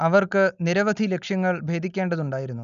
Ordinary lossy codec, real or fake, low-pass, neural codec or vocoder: none; real; 7.2 kHz; none